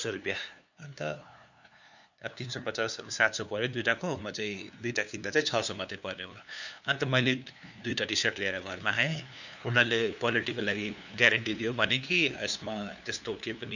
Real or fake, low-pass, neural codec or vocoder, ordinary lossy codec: fake; 7.2 kHz; codec, 16 kHz, 2 kbps, FunCodec, trained on LibriTTS, 25 frames a second; none